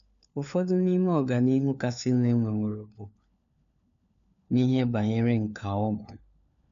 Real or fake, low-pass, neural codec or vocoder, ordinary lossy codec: fake; 7.2 kHz; codec, 16 kHz, 4 kbps, FunCodec, trained on LibriTTS, 50 frames a second; none